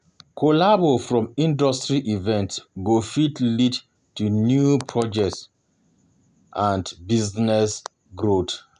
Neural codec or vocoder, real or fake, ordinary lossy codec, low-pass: none; real; none; 10.8 kHz